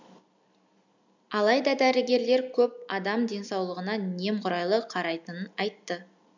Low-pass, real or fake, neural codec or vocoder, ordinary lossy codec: 7.2 kHz; real; none; none